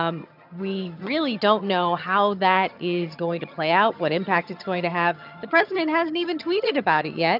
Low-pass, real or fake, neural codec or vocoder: 5.4 kHz; fake; vocoder, 22.05 kHz, 80 mel bands, HiFi-GAN